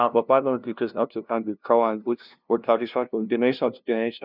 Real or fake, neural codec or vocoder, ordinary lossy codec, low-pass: fake; codec, 16 kHz, 0.5 kbps, FunCodec, trained on LibriTTS, 25 frames a second; none; 5.4 kHz